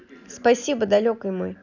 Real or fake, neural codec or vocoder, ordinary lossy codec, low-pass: real; none; none; 7.2 kHz